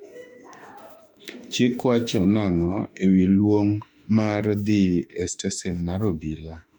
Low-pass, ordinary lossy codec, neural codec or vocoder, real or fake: 19.8 kHz; MP3, 96 kbps; autoencoder, 48 kHz, 32 numbers a frame, DAC-VAE, trained on Japanese speech; fake